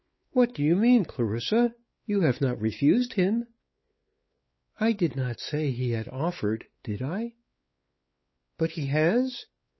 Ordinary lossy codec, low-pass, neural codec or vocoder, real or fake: MP3, 24 kbps; 7.2 kHz; codec, 16 kHz, 4 kbps, X-Codec, WavLM features, trained on Multilingual LibriSpeech; fake